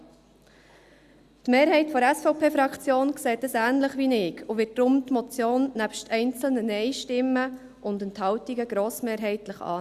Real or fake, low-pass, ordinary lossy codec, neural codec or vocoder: real; 14.4 kHz; Opus, 64 kbps; none